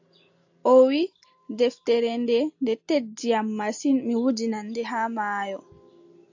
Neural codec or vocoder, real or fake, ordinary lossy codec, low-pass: none; real; AAC, 48 kbps; 7.2 kHz